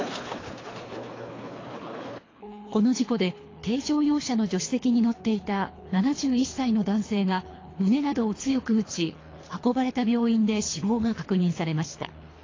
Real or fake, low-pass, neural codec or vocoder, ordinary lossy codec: fake; 7.2 kHz; codec, 24 kHz, 3 kbps, HILCodec; AAC, 32 kbps